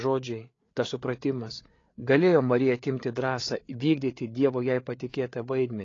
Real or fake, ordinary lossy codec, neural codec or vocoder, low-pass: fake; AAC, 32 kbps; codec, 16 kHz, 8 kbps, FreqCodec, larger model; 7.2 kHz